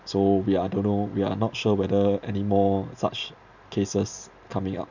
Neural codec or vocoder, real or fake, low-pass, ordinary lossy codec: none; real; 7.2 kHz; none